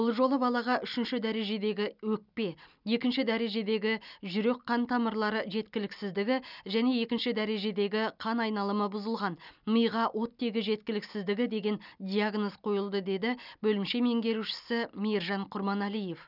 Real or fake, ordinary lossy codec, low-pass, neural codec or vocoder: real; none; 5.4 kHz; none